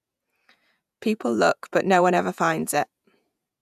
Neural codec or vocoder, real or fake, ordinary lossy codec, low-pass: vocoder, 48 kHz, 128 mel bands, Vocos; fake; none; 14.4 kHz